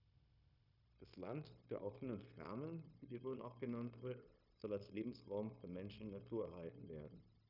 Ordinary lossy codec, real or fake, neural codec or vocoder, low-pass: none; fake; codec, 16 kHz, 0.9 kbps, LongCat-Audio-Codec; 5.4 kHz